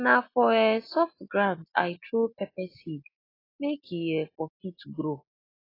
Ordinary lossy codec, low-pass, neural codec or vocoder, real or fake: AAC, 24 kbps; 5.4 kHz; none; real